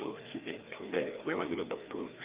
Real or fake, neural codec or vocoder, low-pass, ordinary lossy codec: fake; codec, 16 kHz, 2 kbps, FreqCodec, larger model; 3.6 kHz; Opus, 32 kbps